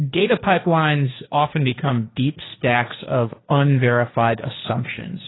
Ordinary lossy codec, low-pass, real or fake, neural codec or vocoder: AAC, 16 kbps; 7.2 kHz; fake; codec, 16 kHz, 1.1 kbps, Voila-Tokenizer